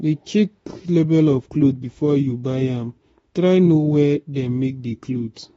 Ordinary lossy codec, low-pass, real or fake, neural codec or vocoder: AAC, 24 kbps; 19.8 kHz; fake; autoencoder, 48 kHz, 32 numbers a frame, DAC-VAE, trained on Japanese speech